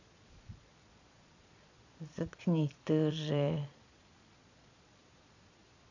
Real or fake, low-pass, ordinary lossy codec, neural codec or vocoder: fake; 7.2 kHz; AAC, 48 kbps; vocoder, 22.05 kHz, 80 mel bands, Vocos